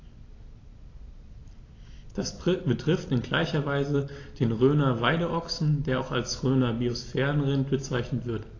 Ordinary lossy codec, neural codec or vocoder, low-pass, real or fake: AAC, 32 kbps; none; 7.2 kHz; real